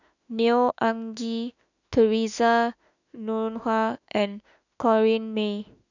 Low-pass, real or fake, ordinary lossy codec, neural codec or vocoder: 7.2 kHz; fake; none; autoencoder, 48 kHz, 32 numbers a frame, DAC-VAE, trained on Japanese speech